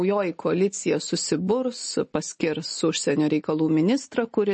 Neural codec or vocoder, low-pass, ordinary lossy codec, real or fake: none; 10.8 kHz; MP3, 32 kbps; real